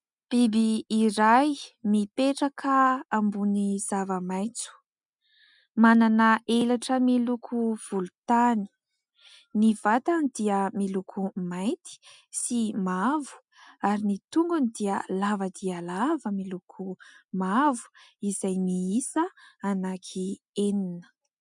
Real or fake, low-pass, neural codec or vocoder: real; 10.8 kHz; none